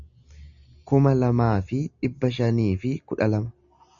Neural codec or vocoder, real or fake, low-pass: none; real; 7.2 kHz